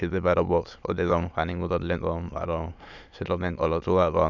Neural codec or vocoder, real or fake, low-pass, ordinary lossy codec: autoencoder, 22.05 kHz, a latent of 192 numbers a frame, VITS, trained on many speakers; fake; 7.2 kHz; none